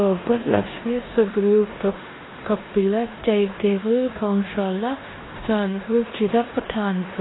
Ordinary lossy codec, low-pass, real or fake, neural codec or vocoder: AAC, 16 kbps; 7.2 kHz; fake; codec, 16 kHz in and 24 kHz out, 0.9 kbps, LongCat-Audio-Codec, four codebook decoder